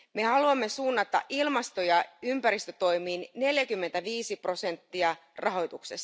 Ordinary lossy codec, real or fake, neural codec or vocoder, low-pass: none; real; none; none